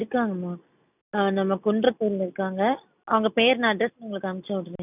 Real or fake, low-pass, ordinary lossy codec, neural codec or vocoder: real; 3.6 kHz; none; none